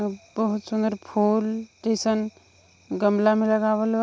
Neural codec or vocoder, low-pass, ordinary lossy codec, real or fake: none; none; none; real